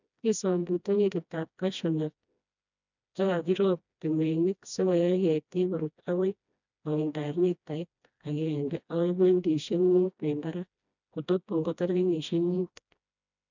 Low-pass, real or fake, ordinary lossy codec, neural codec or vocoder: 7.2 kHz; fake; none; codec, 16 kHz, 1 kbps, FreqCodec, smaller model